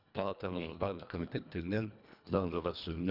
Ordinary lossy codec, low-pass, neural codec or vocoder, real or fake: none; 5.4 kHz; codec, 24 kHz, 1.5 kbps, HILCodec; fake